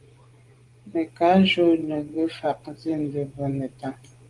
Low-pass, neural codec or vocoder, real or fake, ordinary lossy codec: 10.8 kHz; vocoder, 24 kHz, 100 mel bands, Vocos; fake; Opus, 24 kbps